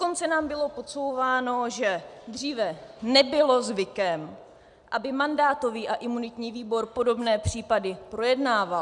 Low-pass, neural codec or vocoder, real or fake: 10.8 kHz; none; real